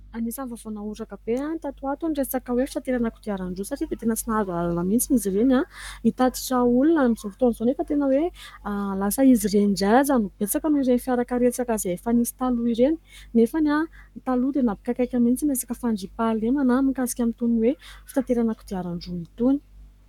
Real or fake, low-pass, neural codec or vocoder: fake; 19.8 kHz; codec, 44.1 kHz, 7.8 kbps, Pupu-Codec